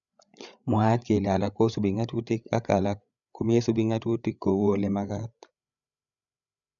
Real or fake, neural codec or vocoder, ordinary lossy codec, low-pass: fake; codec, 16 kHz, 8 kbps, FreqCodec, larger model; none; 7.2 kHz